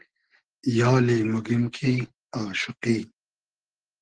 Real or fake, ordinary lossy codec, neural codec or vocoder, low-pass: real; Opus, 16 kbps; none; 9.9 kHz